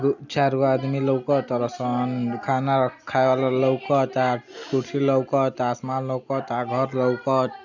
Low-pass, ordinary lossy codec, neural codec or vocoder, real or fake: 7.2 kHz; none; none; real